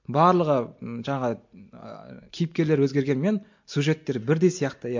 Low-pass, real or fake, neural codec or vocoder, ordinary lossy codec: 7.2 kHz; real; none; MP3, 48 kbps